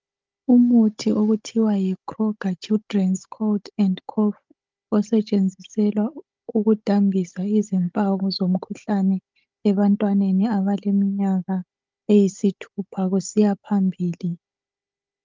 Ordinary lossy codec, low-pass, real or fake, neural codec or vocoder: Opus, 24 kbps; 7.2 kHz; fake; codec, 16 kHz, 16 kbps, FunCodec, trained on Chinese and English, 50 frames a second